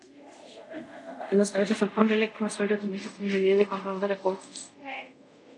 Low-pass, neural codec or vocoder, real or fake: 10.8 kHz; codec, 24 kHz, 0.5 kbps, DualCodec; fake